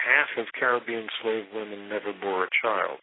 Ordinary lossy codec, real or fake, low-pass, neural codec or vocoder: AAC, 16 kbps; real; 7.2 kHz; none